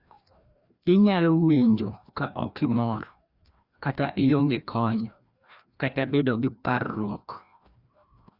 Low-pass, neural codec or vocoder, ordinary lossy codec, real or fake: 5.4 kHz; codec, 16 kHz, 1 kbps, FreqCodec, larger model; Opus, 64 kbps; fake